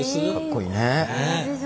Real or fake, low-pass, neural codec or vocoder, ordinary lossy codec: real; none; none; none